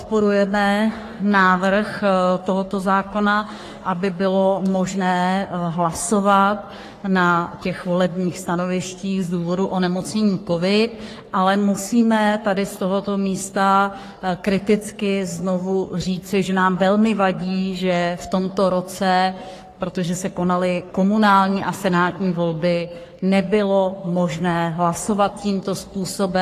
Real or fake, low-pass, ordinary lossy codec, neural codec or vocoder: fake; 14.4 kHz; AAC, 48 kbps; codec, 44.1 kHz, 3.4 kbps, Pupu-Codec